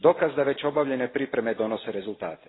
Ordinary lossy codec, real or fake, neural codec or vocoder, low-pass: AAC, 16 kbps; real; none; 7.2 kHz